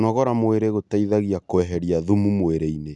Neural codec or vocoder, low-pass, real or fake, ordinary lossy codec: none; 10.8 kHz; real; none